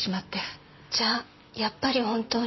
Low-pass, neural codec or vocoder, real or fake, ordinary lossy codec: 7.2 kHz; none; real; MP3, 24 kbps